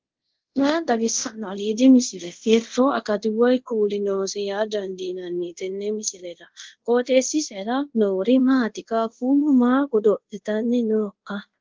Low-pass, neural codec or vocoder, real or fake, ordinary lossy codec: 7.2 kHz; codec, 24 kHz, 0.5 kbps, DualCodec; fake; Opus, 16 kbps